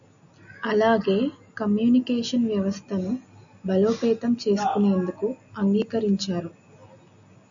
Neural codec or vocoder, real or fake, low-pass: none; real; 7.2 kHz